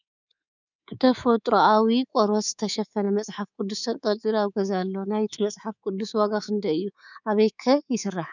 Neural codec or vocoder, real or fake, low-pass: codec, 24 kHz, 3.1 kbps, DualCodec; fake; 7.2 kHz